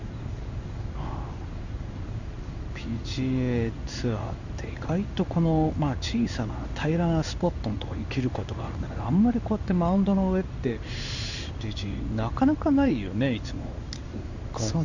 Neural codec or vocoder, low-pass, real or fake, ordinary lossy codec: codec, 16 kHz in and 24 kHz out, 1 kbps, XY-Tokenizer; 7.2 kHz; fake; none